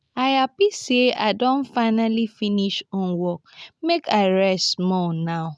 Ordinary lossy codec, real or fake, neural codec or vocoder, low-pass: none; real; none; none